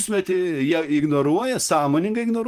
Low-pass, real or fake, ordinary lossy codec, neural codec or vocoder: 14.4 kHz; fake; Opus, 32 kbps; vocoder, 44.1 kHz, 128 mel bands, Pupu-Vocoder